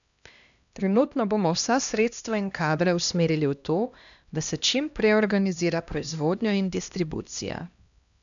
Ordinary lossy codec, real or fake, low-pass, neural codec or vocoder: none; fake; 7.2 kHz; codec, 16 kHz, 1 kbps, X-Codec, HuBERT features, trained on LibriSpeech